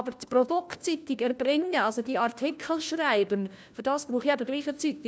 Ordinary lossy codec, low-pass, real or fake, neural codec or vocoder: none; none; fake; codec, 16 kHz, 1 kbps, FunCodec, trained on LibriTTS, 50 frames a second